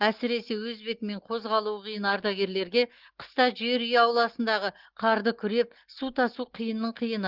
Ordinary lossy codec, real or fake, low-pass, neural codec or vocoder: Opus, 16 kbps; real; 5.4 kHz; none